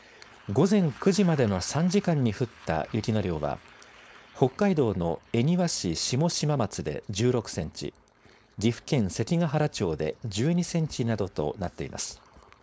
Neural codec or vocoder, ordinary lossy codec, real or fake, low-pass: codec, 16 kHz, 4.8 kbps, FACodec; none; fake; none